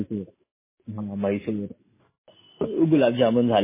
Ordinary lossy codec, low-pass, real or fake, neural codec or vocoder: MP3, 16 kbps; 3.6 kHz; real; none